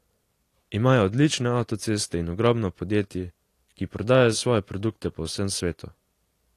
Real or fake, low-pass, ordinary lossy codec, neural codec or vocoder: real; 14.4 kHz; AAC, 48 kbps; none